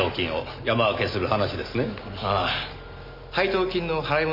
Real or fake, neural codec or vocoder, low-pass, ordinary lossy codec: real; none; 5.4 kHz; none